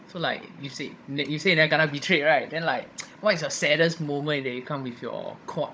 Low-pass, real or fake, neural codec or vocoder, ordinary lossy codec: none; fake; codec, 16 kHz, 16 kbps, FunCodec, trained on Chinese and English, 50 frames a second; none